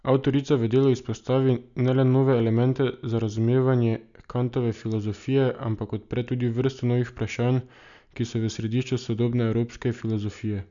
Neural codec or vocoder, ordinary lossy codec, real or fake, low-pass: none; none; real; 7.2 kHz